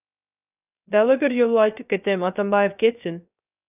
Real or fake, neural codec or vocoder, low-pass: fake; codec, 16 kHz, 0.3 kbps, FocalCodec; 3.6 kHz